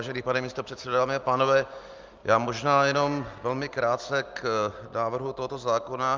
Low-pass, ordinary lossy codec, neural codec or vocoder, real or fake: 7.2 kHz; Opus, 24 kbps; none; real